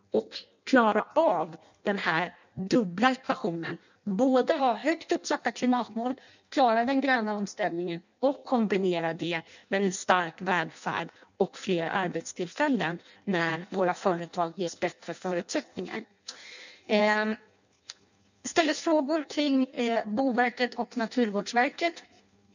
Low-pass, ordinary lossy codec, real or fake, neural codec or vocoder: 7.2 kHz; none; fake; codec, 16 kHz in and 24 kHz out, 0.6 kbps, FireRedTTS-2 codec